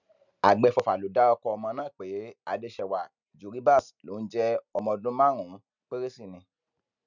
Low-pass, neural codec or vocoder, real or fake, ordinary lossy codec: 7.2 kHz; none; real; none